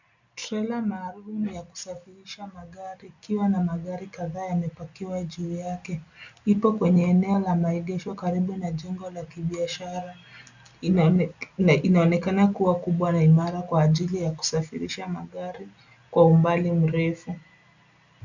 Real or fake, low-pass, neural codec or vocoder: real; 7.2 kHz; none